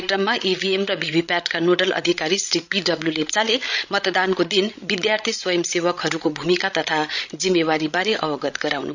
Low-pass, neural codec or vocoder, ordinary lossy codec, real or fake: 7.2 kHz; codec, 16 kHz, 16 kbps, FreqCodec, larger model; none; fake